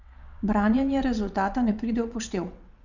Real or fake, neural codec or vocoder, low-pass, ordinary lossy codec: fake; vocoder, 22.05 kHz, 80 mel bands, WaveNeXt; 7.2 kHz; none